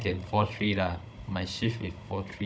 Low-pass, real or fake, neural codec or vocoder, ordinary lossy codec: none; fake; codec, 16 kHz, 16 kbps, FunCodec, trained on Chinese and English, 50 frames a second; none